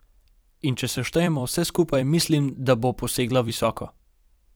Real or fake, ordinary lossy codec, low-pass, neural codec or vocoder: fake; none; none; vocoder, 44.1 kHz, 128 mel bands every 256 samples, BigVGAN v2